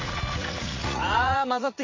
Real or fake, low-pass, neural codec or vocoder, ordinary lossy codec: fake; 7.2 kHz; vocoder, 44.1 kHz, 80 mel bands, Vocos; MP3, 32 kbps